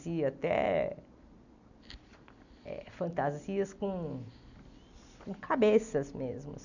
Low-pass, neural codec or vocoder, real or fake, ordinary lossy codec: 7.2 kHz; none; real; none